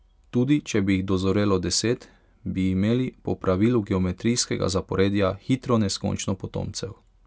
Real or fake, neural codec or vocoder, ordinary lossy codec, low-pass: real; none; none; none